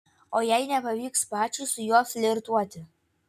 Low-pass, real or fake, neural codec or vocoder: 14.4 kHz; real; none